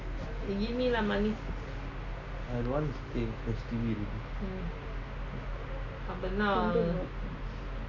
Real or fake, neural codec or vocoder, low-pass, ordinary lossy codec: real; none; 7.2 kHz; none